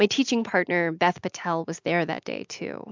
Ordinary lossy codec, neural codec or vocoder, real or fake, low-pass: MP3, 64 kbps; none; real; 7.2 kHz